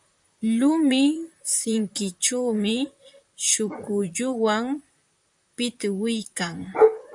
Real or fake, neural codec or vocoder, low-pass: fake; vocoder, 44.1 kHz, 128 mel bands, Pupu-Vocoder; 10.8 kHz